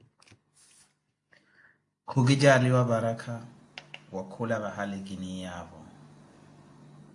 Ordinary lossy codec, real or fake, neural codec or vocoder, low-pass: AAC, 48 kbps; real; none; 10.8 kHz